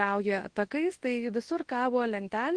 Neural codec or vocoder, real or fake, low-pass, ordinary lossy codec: codec, 24 kHz, 0.9 kbps, WavTokenizer, large speech release; fake; 9.9 kHz; Opus, 16 kbps